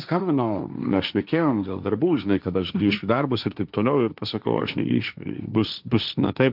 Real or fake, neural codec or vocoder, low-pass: fake; codec, 16 kHz, 1.1 kbps, Voila-Tokenizer; 5.4 kHz